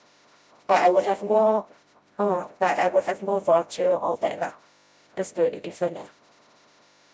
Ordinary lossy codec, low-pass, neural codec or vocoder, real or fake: none; none; codec, 16 kHz, 0.5 kbps, FreqCodec, smaller model; fake